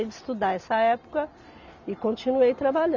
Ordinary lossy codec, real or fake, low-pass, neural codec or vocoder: none; real; 7.2 kHz; none